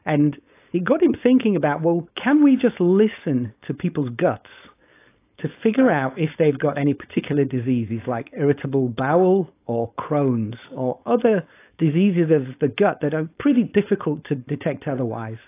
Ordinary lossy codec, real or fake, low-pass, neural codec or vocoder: AAC, 24 kbps; fake; 3.6 kHz; codec, 16 kHz, 4.8 kbps, FACodec